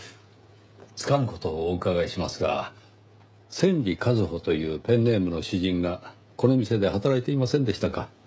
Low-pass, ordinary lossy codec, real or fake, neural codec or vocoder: none; none; fake; codec, 16 kHz, 16 kbps, FreqCodec, smaller model